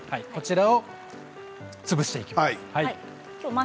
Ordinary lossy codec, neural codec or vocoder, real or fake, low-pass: none; none; real; none